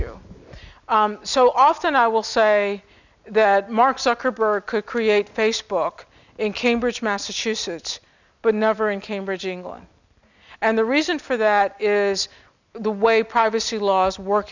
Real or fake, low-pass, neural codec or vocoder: real; 7.2 kHz; none